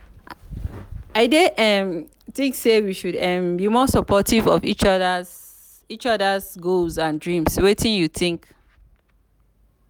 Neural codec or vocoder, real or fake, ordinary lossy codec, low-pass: none; real; none; none